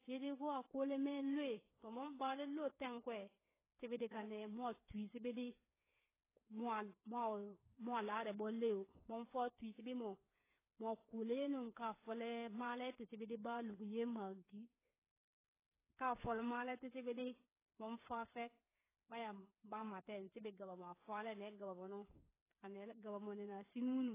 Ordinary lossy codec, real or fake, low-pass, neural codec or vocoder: AAC, 16 kbps; fake; 3.6 kHz; codec, 16 kHz, 8 kbps, FreqCodec, smaller model